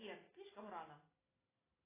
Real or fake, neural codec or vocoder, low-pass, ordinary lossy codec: real; none; 3.6 kHz; AAC, 16 kbps